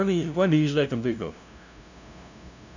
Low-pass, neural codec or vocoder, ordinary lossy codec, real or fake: 7.2 kHz; codec, 16 kHz, 0.5 kbps, FunCodec, trained on LibriTTS, 25 frames a second; none; fake